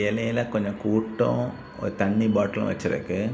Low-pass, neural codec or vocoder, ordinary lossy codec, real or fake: none; none; none; real